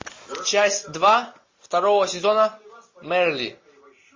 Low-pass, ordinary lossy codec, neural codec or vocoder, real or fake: 7.2 kHz; MP3, 32 kbps; none; real